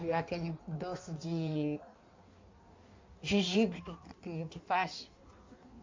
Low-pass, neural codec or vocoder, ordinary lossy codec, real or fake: 7.2 kHz; codec, 16 kHz in and 24 kHz out, 1.1 kbps, FireRedTTS-2 codec; MP3, 64 kbps; fake